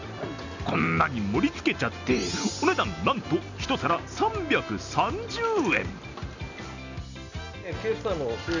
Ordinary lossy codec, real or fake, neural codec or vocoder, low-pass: none; real; none; 7.2 kHz